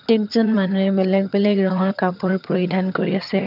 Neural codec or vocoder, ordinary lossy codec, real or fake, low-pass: vocoder, 22.05 kHz, 80 mel bands, HiFi-GAN; none; fake; 5.4 kHz